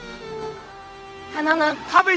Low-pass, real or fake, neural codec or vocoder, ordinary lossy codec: none; fake; codec, 16 kHz, 0.4 kbps, LongCat-Audio-Codec; none